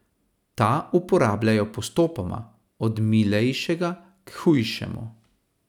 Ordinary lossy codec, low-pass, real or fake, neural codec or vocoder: none; 19.8 kHz; real; none